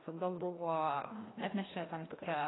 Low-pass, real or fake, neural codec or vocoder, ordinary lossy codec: 7.2 kHz; fake; codec, 16 kHz, 1 kbps, FreqCodec, larger model; AAC, 16 kbps